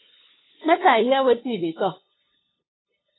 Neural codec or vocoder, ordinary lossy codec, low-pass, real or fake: codec, 16 kHz, 2 kbps, FunCodec, trained on LibriTTS, 25 frames a second; AAC, 16 kbps; 7.2 kHz; fake